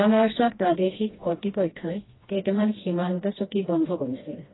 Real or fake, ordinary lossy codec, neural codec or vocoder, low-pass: fake; AAC, 16 kbps; codec, 16 kHz, 1 kbps, FreqCodec, smaller model; 7.2 kHz